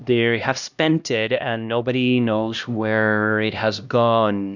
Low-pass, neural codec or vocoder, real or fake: 7.2 kHz; codec, 16 kHz, 1 kbps, X-Codec, HuBERT features, trained on LibriSpeech; fake